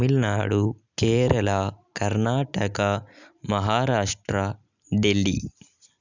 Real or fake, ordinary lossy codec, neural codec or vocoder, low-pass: real; none; none; 7.2 kHz